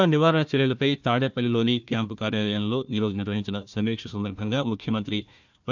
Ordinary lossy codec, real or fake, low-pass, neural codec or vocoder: none; fake; 7.2 kHz; codec, 16 kHz, 1 kbps, FunCodec, trained on Chinese and English, 50 frames a second